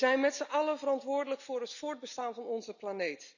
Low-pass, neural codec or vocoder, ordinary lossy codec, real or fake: 7.2 kHz; none; none; real